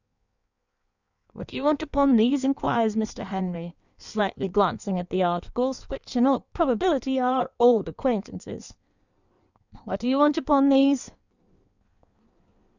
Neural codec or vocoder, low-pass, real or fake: codec, 16 kHz in and 24 kHz out, 1.1 kbps, FireRedTTS-2 codec; 7.2 kHz; fake